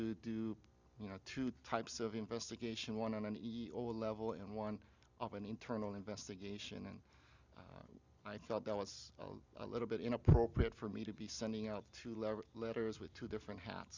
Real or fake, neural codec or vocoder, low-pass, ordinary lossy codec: real; none; 7.2 kHz; Opus, 64 kbps